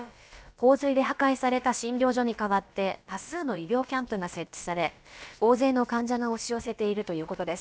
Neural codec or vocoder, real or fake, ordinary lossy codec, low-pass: codec, 16 kHz, about 1 kbps, DyCAST, with the encoder's durations; fake; none; none